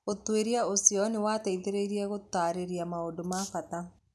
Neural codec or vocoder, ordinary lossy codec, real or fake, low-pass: none; none; real; none